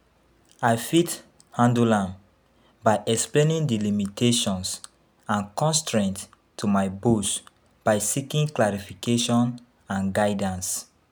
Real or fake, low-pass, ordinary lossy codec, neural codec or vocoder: real; none; none; none